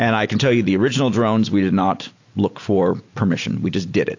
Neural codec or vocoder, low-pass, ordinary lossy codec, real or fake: none; 7.2 kHz; AAC, 48 kbps; real